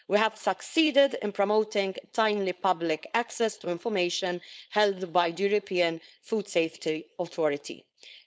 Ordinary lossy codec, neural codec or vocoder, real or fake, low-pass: none; codec, 16 kHz, 4.8 kbps, FACodec; fake; none